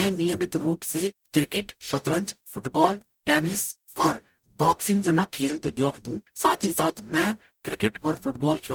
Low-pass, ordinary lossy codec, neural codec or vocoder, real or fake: none; none; codec, 44.1 kHz, 0.9 kbps, DAC; fake